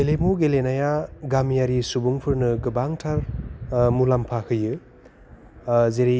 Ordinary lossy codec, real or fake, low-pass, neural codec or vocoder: none; real; none; none